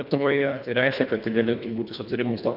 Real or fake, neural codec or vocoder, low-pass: fake; codec, 24 kHz, 1.5 kbps, HILCodec; 5.4 kHz